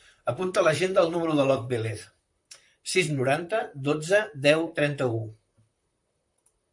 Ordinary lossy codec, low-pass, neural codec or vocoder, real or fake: MP3, 64 kbps; 10.8 kHz; vocoder, 44.1 kHz, 128 mel bands, Pupu-Vocoder; fake